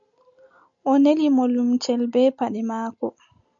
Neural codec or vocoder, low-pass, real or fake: none; 7.2 kHz; real